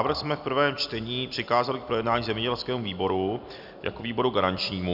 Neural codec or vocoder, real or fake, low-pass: none; real; 5.4 kHz